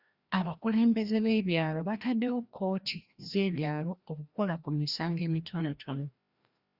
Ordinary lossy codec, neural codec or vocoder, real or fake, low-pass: Opus, 64 kbps; codec, 16 kHz, 1 kbps, FreqCodec, larger model; fake; 5.4 kHz